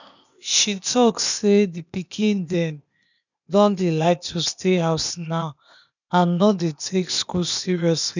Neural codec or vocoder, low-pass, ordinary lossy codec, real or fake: codec, 16 kHz, 0.8 kbps, ZipCodec; 7.2 kHz; none; fake